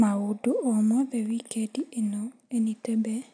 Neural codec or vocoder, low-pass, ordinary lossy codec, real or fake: none; 9.9 kHz; none; real